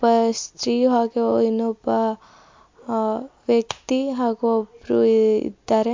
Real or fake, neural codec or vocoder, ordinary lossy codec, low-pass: real; none; MP3, 48 kbps; 7.2 kHz